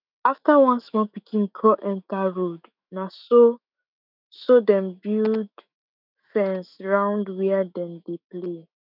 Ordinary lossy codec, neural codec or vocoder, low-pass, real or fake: none; autoencoder, 48 kHz, 128 numbers a frame, DAC-VAE, trained on Japanese speech; 5.4 kHz; fake